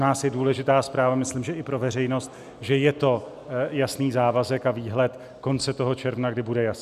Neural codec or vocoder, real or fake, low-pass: none; real; 14.4 kHz